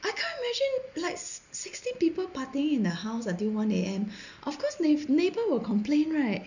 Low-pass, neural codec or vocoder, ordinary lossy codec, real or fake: 7.2 kHz; none; none; real